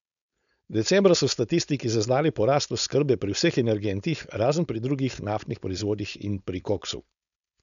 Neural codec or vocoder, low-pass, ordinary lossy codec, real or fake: codec, 16 kHz, 4.8 kbps, FACodec; 7.2 kHz; none; fake